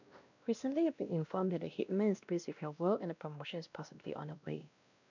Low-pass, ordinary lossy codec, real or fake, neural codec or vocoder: 7.2 kHz; none; fake; codec, 16 kHz, 1 kbps, X-Codec, WavLM features, trained on Multilingual LibriSpeech